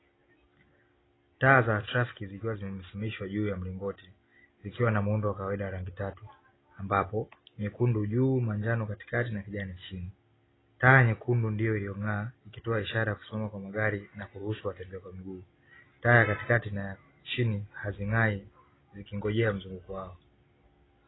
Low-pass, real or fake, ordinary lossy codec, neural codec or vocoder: 7.2 kHz; real; AAC, 16 kbps; none